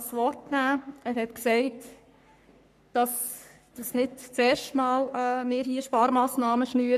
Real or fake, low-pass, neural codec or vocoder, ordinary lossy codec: fake; 14.4 kHz; codec, 44.1 kHz, 3.4 kbps, Pupu-Codec; none